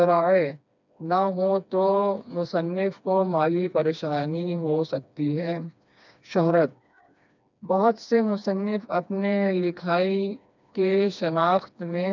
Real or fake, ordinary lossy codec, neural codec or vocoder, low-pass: fake; none; codec, 16 kHz, 2 kbps, FreqCodec, smaller model; 7.2 kHz